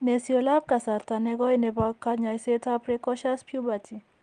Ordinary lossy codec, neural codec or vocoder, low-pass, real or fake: Opus, 24 kbps; none; 9.9 kHz; real